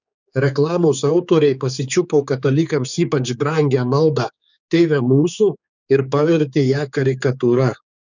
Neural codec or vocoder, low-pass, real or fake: codec, 16 kHz, 4 kbps, X-Codec, HuBERT features, trained on general audio; 7.2 kHz; fake